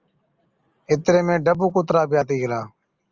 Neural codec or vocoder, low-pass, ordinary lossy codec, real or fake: none; 7.2 kHz; Opus, 24 kbps; real